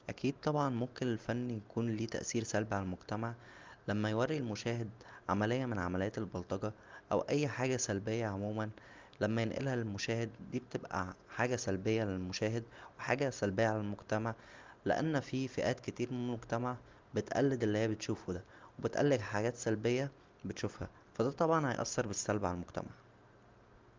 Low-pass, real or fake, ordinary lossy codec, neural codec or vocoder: 7.2 kHz; real; Opus, 24 kbps; none